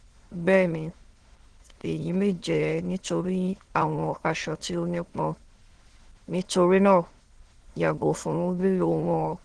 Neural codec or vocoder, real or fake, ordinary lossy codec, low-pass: autoencoder, 22.05 kHz, a latent of 192 numbers a frame, VITS, trained on many speakers; fake; Opus, 16 kbps; 9.9 kHz